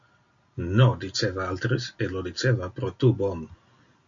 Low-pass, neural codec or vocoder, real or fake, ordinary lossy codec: 7.2 kHz; none; real; MP3, 48 kbps